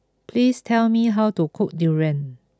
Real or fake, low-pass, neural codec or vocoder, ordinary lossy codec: real; none; none; none